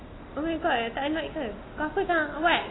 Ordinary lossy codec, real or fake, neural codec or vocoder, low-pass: AAC, 16 kbps; real; none; 7.2 kHz